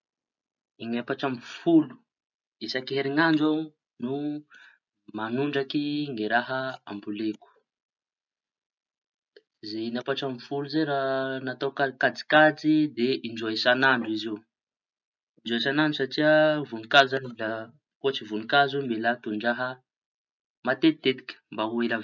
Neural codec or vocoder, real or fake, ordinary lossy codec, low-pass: none; real; none; 7.2 kHz